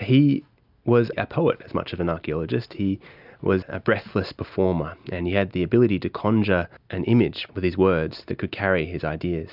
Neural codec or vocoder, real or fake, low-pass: none; real; 5.4 kHz